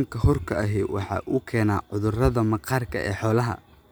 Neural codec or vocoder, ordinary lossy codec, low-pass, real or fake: none; none; none; real